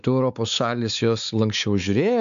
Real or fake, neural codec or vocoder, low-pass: fake; codec, 16 kHz, 4 kbps, X-Codec, WavLM features, trained on Multilingual LibriSpeech; 7.2 kHz